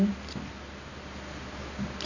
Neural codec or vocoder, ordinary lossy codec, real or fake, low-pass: none; none; real; 7.2 kHz